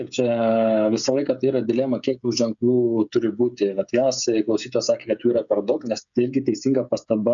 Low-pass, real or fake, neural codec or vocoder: 7.2 kHz; fake; codec, 16 kHz, 8 kbps, FreqCodec, smaller model